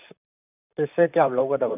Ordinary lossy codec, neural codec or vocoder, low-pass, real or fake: none; vocoder, 44.1 kHz, 128 mel bands, Pupu-Vocoder; 3.6 kHz; fake